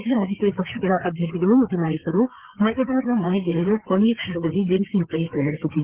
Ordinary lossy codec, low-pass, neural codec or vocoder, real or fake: Opus, 16 kbps; 3.6 kHz; codec, 16 kHz, 4 kbps, FreqCodec, larger model; fake